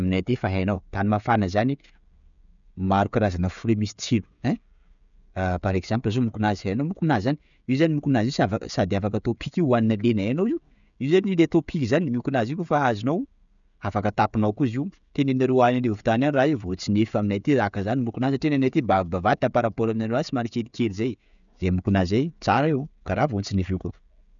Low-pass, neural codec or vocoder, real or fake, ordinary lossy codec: 7.2 kHz; codec, 16 kHz, 16 kbps, FreqCodec, smaller model; fake; none